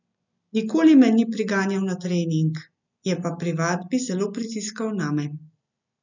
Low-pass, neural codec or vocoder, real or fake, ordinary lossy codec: 7.2 kHz; none; real; none